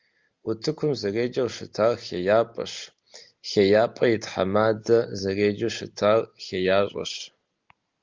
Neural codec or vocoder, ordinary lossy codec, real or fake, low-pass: none; Opus, 32 kbps; real; 7.2 kHz